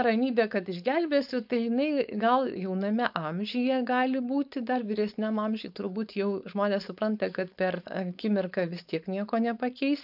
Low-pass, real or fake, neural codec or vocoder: 5.4 kHz; fake; codec, 16 kHz, 4.8 kbps, FACodec